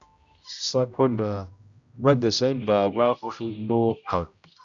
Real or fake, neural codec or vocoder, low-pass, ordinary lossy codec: fake; codec, 16 kHz, 0.5 kbps, X-Codec, HuBERT features, trained on general audio; 7.2 kHz; Opus, 64 kbps